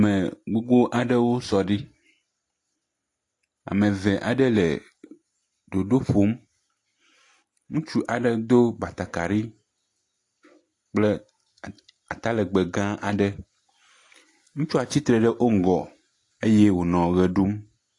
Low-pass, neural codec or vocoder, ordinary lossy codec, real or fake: 10.8 kHz; none; AAC, 48 kbps; real